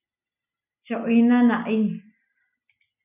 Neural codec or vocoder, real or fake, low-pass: none; real; 3.6 kHz